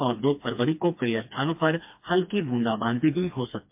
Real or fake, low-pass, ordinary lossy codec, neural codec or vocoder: fake; 3.6 kHz; MP3, 32 kbps; codec, 16 kHz, 2 kbps, FreqCodec, smaller model